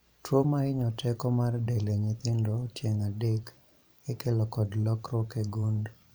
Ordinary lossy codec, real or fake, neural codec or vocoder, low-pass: none; fake; vocoder, 44.1 kHz, 128 mel bands every 512 samples, BigVGAN v2; none